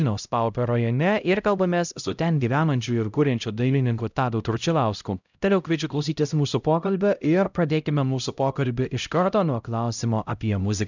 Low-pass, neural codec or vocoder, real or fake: 7.2 kHz; codec, 16 kHz, 0.5 kbps, X-Codec, HuBERT features, trained on LibriSpeech; fake